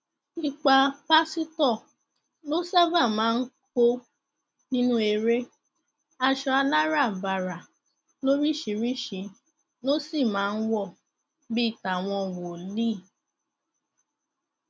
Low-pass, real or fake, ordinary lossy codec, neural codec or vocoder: none; real; none; none